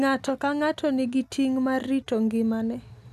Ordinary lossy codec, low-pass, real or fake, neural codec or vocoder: none; 14.4 kHz; real; none